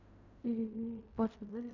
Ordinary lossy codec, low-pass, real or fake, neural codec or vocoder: none; 7.2 kHz; fake; codec, 16 kHz in and 24 kHz out, 0.4 kbps, LongCat-Audio-Codec, fine tuned four codebook decoder